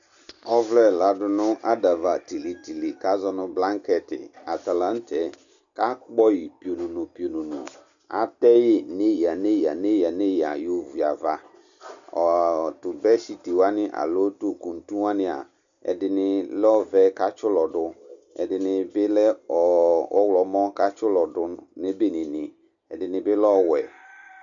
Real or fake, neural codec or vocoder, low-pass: real; none; 7.2 kHz